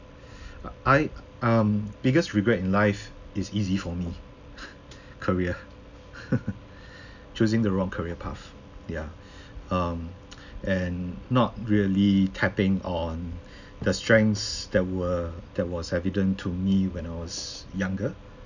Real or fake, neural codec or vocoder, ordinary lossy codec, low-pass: real; none; none; 7.2 kHz